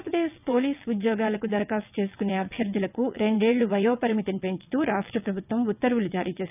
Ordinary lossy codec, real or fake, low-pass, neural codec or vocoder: none; fake; 3.6 kHz; vocoder, 22.05 kHz, 80 mel bands, WaveNeXt